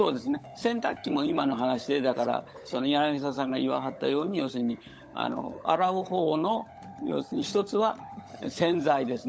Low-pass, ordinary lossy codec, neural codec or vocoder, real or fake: none; none; codec, 16 kHz, 16 kbps, FunCodec, trained on LibriTTS, 50 frames a second; fake